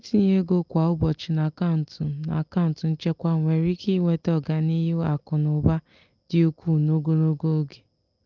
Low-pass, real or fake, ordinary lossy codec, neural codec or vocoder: 7.2 kHz; real; Opus, 24 kbps; none